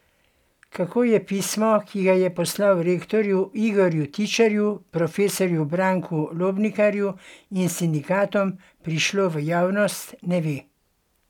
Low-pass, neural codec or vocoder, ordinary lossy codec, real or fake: 19.8 kHz; none; none; real